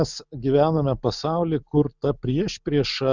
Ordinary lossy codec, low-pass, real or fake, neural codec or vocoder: Opus, 64 kbps; 7.2 kHz; real; none